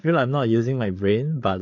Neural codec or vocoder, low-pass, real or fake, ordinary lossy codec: codec, 16 kHz, 8 kbps, FreqCodec, larger model; 7.2 kHz; fake; AAC, 48 kbps